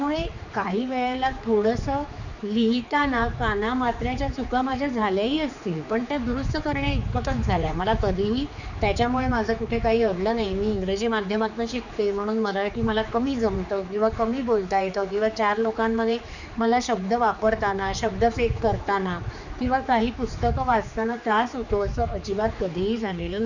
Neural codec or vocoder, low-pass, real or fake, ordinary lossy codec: codec, 16 kHz, 4 kbps, X-Codec, HuBERT features, trained on general audio; 7.2 kHz; fake; none